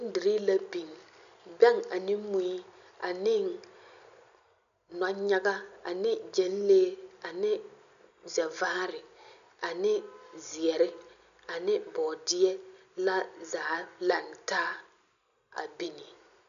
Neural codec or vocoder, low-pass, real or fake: none; 7.2 kHz; real